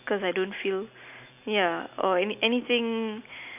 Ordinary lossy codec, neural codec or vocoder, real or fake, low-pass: none; none; real; 3.6 kHz